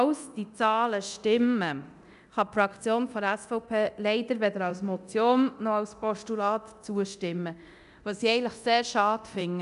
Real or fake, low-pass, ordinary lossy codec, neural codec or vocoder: fake; 10.8 kHz; none; codec, 24 kHz, 0.9 kbps, DualCodec